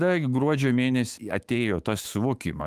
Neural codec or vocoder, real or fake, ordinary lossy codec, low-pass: autoencoder, 48 kHz, 128 numbers a frame, DAC-VAE, trained on Japanese speech; fake; Opus, 24 kbps; 14.4 kHz